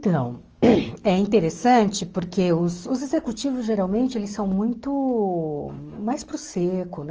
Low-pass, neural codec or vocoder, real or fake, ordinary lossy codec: 7.2 kHz; codec, 44.1 kHz, 7.8 kbps, DAC; fake; Opus, 24 kbps